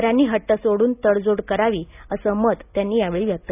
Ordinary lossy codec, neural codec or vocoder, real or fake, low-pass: none; none; real; 3.6 kHz